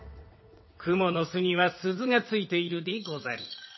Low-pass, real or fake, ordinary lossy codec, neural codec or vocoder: 7.2 kHz; fake; MP3, 24 kbps; vocoder, 22.05 kHz, 80 mel bands, WaveNeXt